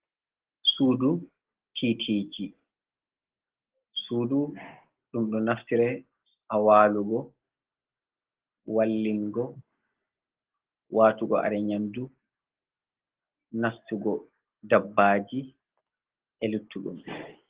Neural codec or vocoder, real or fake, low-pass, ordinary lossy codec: none; real; 3.6 kHz; Opus, 16 kbps